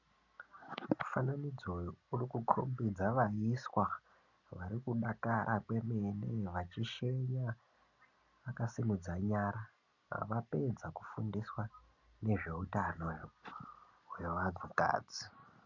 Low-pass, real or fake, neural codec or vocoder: 7.2 kHz; real; none